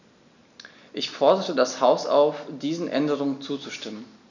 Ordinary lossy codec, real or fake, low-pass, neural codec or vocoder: none; real; 7.2 kHz; none